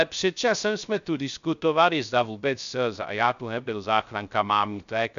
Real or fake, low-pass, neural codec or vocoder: fake; 7.2 kHz; codec, 16 kHz, 0.3 kbps, FocalCodec